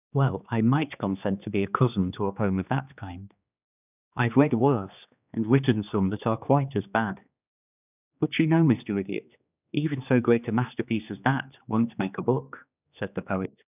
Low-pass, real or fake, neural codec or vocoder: 3.6 kHz; fake; codec, 16 kHz, 2 kbps, X-Codec, HuBERT features, trained on general audio